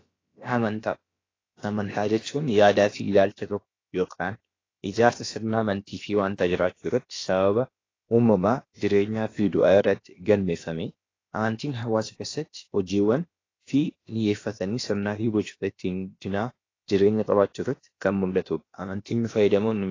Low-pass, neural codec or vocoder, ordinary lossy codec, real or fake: 7.2 kHz; codec, 16 kHz, about 1 kbps, DyCAST, with the encoder's durations; AAC, 32 kbps; fake